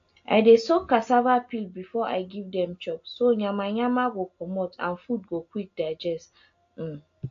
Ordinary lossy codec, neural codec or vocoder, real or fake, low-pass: AAC, 48 kbps; none; real; 7.2 kHz